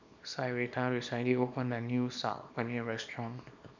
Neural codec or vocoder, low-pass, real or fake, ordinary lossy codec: codec, 24 kHz, 0.9 kbps, WavTokenizer, small release; 7.2 kHz; fake; none